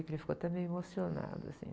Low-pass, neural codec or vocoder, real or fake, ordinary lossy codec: none; none; real; none